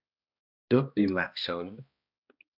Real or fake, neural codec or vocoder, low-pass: fake; codec, 16 kHz, 1 kbps, X-Codec, HuBERT features, trained on balanced general audio; 5.4 kHz